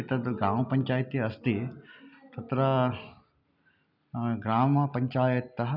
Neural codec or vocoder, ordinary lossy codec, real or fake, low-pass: none; none; real; 5.4 kHz